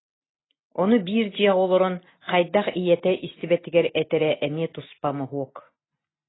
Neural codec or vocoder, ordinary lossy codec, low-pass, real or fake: none; AAC, 16 kbps; 7.2 kHz; real